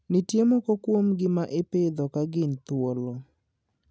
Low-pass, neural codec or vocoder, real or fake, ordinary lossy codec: none; none; real; none